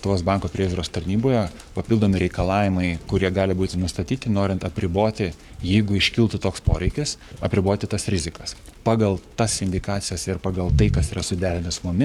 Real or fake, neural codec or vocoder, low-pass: fake; codec, 44.1 kHz, 7.8 kbps, Pupu-Codec; 19.8 kHz